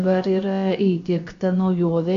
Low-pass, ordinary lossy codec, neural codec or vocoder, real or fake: 7.2 kHz; MP3, 48 kbps; codec, 16 kHz, about 1 kbps, DyCAST, with the encoder's durations; fake